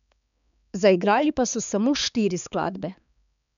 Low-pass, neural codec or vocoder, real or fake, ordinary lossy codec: 7.2 kHz; codec, 16 kHz, 4 kbps, X-Codec, HuBERT features, trained on balanced general audio; fake; none